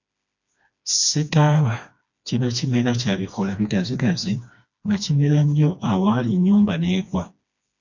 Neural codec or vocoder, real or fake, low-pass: codec, 16 kHz, 2 kbps, FreqCodec, smaller model; fake; 7.2 kHz